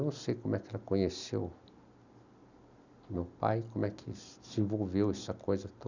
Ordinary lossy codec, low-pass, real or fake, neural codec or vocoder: none; 7.2 kHz; real; none